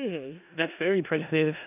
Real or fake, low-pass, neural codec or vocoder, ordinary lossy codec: fake; 3.6 kHz; codec, 16 kHz in and 24 kHz out, 0.4 kbps, LongCat-Audio-Codec, four codebook decoder; AAC, 32 kbps